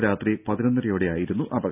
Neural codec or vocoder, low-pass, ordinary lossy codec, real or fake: none; 3.6 kHz; none; real